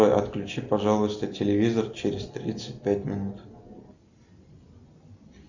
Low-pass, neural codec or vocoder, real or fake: 7.2 kHz; none; real